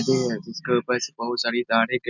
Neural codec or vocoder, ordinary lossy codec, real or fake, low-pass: none; none; real; 7.2 kHz